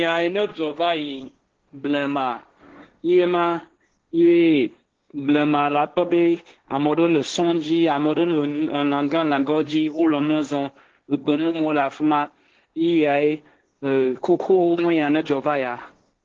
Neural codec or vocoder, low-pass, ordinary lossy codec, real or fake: codec, 16 kHz, 1.1 kbps, Voila-Tokenizer; 7.2 kHz; Opus, 16 kbps; fake